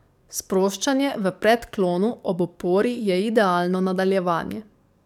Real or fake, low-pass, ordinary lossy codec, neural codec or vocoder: fake; 19.8 kHz; none; codec, 44.1 kHz, 7.8 kbps, DAC